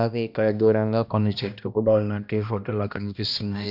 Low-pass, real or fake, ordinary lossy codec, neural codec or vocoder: 5.4 kHz; fake; none; codec, 16 kHz, 1 kbps, X-Codec, HuBERT features, trained on balanced general audio